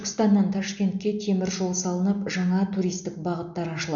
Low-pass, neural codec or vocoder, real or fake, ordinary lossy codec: 7.2 kHz; none; real; none